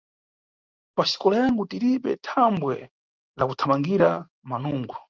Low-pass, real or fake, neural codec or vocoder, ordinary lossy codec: 7.2 kHz; real; none; Opus, 32 kbps